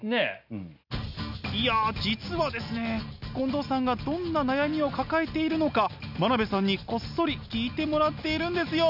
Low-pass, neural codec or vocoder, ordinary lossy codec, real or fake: 5.4 kHz; none; none; real